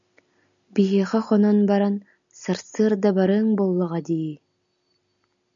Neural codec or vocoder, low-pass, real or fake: none; 7.2 kHz; real